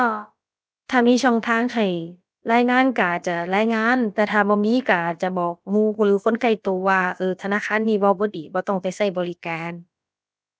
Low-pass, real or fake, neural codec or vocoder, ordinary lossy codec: none; fake; codec, 16 kHz, about 1 kbps, DyCAST, with the encoder's durations; none